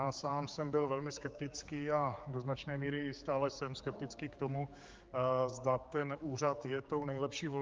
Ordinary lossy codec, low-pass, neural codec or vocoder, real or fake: Opus, 24 kbps; 7.2 kHz; codec, 16 kHz, 4 kbps, X-Codec, HuBERT features, trained on general audio; fake